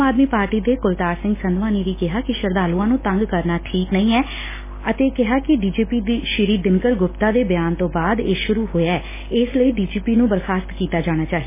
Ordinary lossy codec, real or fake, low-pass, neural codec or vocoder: MP3, 16 kbps; real; 3.6 kHz; none